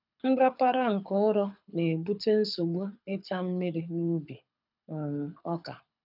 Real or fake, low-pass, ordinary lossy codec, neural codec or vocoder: fake; 5.4 kHz; none; codec, 24 kHz, 6 kbps, HILCodec